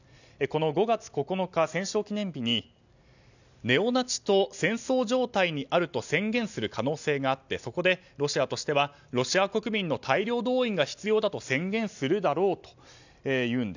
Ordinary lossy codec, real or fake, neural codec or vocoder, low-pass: none; real; none; 7.2 kHz